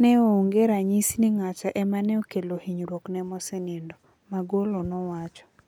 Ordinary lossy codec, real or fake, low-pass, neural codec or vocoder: none; real; 19.8 kHz; none